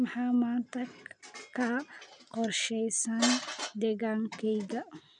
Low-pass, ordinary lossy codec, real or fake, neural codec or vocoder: 9.9 kHz; none; real; none